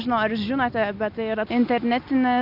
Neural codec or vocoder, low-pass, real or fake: none; 5.4 kHz; real